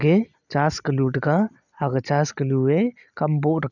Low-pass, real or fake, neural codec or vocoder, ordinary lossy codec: 7.2 kHz; real; none; none